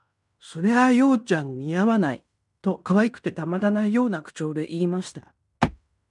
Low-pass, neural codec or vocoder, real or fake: 10.8 kHz; codec, 16 kHz in and 24 kHz out, 0.9 kbps, LongCat-Audio-Codec, fine tuned four codebook decoder; fake